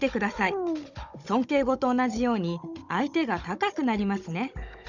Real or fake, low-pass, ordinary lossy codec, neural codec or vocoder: fake; 7.2 kHz; none; codec, 16 kHz, 16 kbps, FunCodec, trained on Chinese and English, 50 frames a second